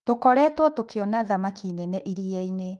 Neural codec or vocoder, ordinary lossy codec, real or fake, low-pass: autoencoder, 48 kHz, 32 numbers a frame, DAC-VAE, trained on Japanese speech; Opus, 24 kbps; fake; 10.8 kHz